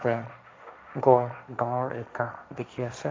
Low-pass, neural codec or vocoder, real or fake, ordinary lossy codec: none; codec, 16 kHz, 1.1 kbps, Voila-Tokenizer; fake; none